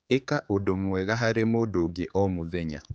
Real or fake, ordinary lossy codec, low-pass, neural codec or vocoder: fake; none; none; codec, 16 kHz, 4 kbps, X-Codec, HuBERT features, trained on general audio